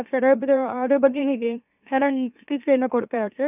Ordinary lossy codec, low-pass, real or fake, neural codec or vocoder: none; 3.6 kHz; fake; autoencoder, 44.1 kHz, a latent of 192 numbers a frame, MeloTTS